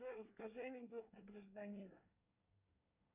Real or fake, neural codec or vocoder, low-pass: fake; codec, 24 kHz, 1 kbps, SNAC; 3.6 kHz